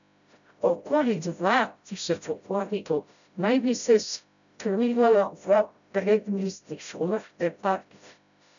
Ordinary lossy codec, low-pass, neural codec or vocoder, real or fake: none; 7.2 kHz; codec, 16 kHz, 0.5 kbps, FreqCodec, smaller model; fake